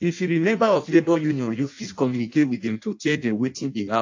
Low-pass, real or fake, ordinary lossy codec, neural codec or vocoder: 7.2 kHz; fake; AAC, 48 kbps; codec, 16 kHz in and 24 kHz out, 0.6 kbps, FireRedTTS-2 codec